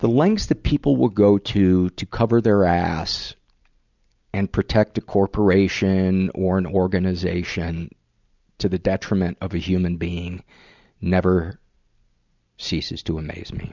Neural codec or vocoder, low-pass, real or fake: none; 7.2 kHz; real